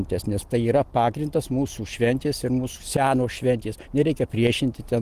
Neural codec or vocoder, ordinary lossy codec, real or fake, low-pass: vocoder, 44.1 kHz, 128 mel bands every 256 samples, BigVGAN v2; Opus, 24 kbps; fake; 14.4 kHz